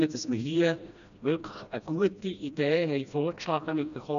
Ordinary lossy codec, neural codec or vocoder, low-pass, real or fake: none; codec, 16 kHz, 1 kbps, FreqCodec, smaller model; 7.2 kHz; fake